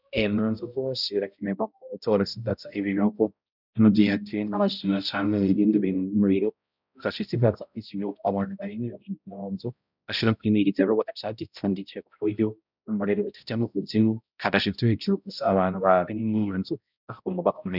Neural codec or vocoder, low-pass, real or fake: codec, 16 kHz, 0.5 kbps, X-Codec, HuBERT features, trained on balanced general audio; 5.4 kHz; fake